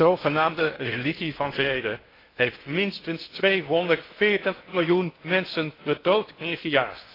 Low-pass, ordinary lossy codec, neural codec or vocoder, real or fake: 5.4 kHz; AAC, 24 kbps; codec, 16 kHz in and 24 kHz out, 0.6 kbps, FocalCodec, streaming, 4096 codes; fake